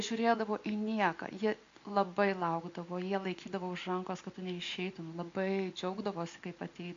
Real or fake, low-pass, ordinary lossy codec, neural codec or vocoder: real; 7.2 kHz; MP3, 64 kbps; none